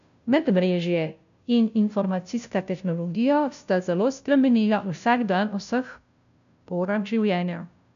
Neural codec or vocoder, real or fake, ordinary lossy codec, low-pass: codec, 16 kHz, 0.5 kbps, FunCodec, trained on Chinese and English, 25 frames a second; fake; none; 7.2 kHz